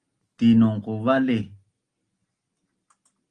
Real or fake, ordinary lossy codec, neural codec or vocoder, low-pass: real; Opus, 24 kbps; none; 9.9 kHz